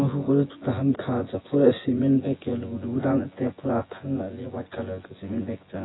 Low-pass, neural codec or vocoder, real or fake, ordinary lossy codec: 7.2 kHz; vocoder, 24 kHz, 100 mel bands, Vocos; fake; AAC, 16 kbps